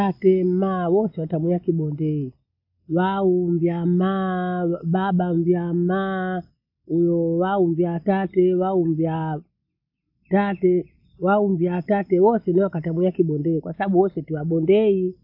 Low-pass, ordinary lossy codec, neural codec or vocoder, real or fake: 5.4 kHz; AAC, 48 kbps; none; real